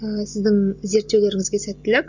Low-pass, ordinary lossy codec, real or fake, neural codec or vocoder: 7.2 kHz; none; real; none